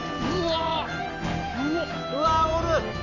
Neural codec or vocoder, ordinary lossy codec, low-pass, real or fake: none; none; 7.2 kHz; real